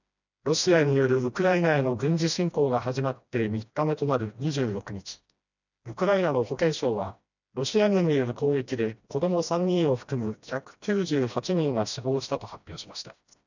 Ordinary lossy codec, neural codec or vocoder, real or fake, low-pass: none; codec, 16 kHz, 1 kbps, FreqCodec, smaller model; fake; 7.2 kHz